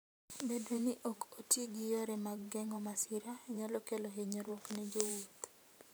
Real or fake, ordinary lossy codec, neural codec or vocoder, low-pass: fake; none; vocoder, 44.1 kHz, 128 mel bands every 512 samples, BigVGAN v2; none